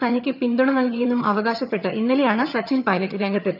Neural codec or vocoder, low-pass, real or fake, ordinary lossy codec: vocoder, 22.05 kHz, 80 mel bands, HiFi-GAN; 5.4 kHz; fake; none